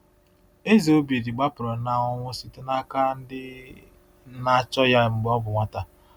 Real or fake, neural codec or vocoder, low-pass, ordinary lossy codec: real; none; none; none